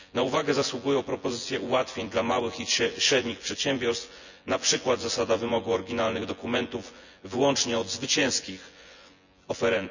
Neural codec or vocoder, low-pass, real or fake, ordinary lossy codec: vocoder, 24 kHz, 100 mel bands, Vocos; 7.2 kHz; fake; none